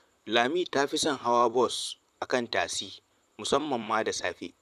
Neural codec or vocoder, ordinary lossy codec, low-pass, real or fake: vocoder, 44.1 kHz, 128 mel bands, Pupu-Vocoder; none; 14.4 kHz; fake